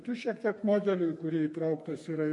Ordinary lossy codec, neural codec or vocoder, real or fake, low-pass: MP3, 48 kbps; codec, 32 kHz, 1.9 kbps, SNAC; fake; 10.8 kHz